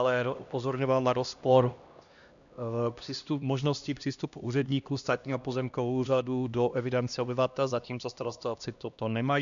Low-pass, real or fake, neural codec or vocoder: 7.2 kHz; fake; codec, 16 kHz, 1 kbps, X-Codec, HuBERT features, trained on LibriSpeech